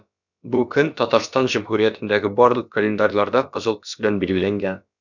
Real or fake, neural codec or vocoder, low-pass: fake; codec, 16 kHz, about 1 kbps, DyCAST, with the encoder's durations; 7.2 kHz